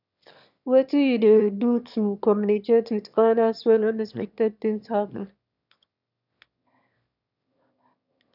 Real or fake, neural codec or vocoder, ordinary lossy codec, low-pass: fake; autoencoder, 22.05 kHz, a latent of 192 numbers a frame, VITS, trained on one speaker; none; 5.4 kHz